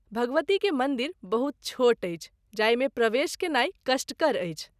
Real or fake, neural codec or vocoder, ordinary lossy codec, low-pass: real; none; none; 14.4 kHz